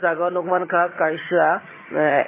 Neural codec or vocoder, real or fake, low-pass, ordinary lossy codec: vocoder, 22.05 kHz, 80 mel bands, HiFi-GAN; fake; 3.6 kHz; MP3, 16 kbps